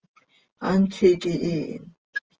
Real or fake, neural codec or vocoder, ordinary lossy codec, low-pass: real; none; Opus, 24 kbps; 7.2 kHz